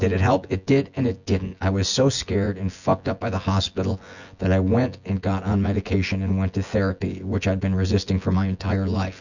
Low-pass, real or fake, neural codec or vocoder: 7.2 kHz; fake; vocoder, 24 kHz, 100 mel bands, Vocos